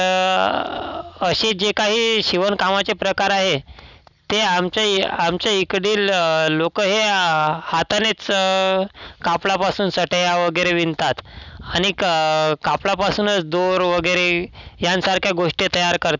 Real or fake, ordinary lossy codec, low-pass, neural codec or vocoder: real; none; 7.2 kHz; none